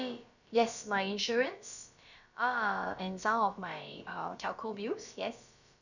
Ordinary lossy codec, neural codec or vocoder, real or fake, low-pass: none; codec, 16 kHz, about 1 kbps, DyCAST, with the encoder's durations; fake; 7.2 kHz